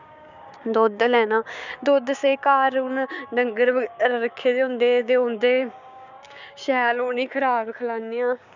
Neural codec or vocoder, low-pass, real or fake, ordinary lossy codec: codec, 16 kHz, 6 kbps, DAC; 7.2 kHz; fake; none